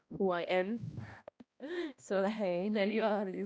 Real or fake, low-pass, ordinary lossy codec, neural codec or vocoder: fake; none; none; codec, 16 kHz, 1 kbps, X-Codec, HuBERT features, trained on balanced general audio